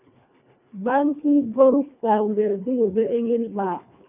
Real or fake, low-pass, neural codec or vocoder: fake; 3.6 kHz; codec, 24 kHz, 1.5 kbps, HILCodec